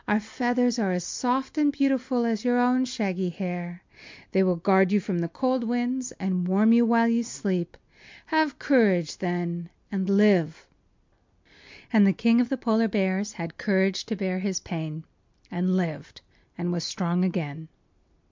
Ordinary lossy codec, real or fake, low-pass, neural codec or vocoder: MP3, 64 kbps; real; 7.2 kHz; none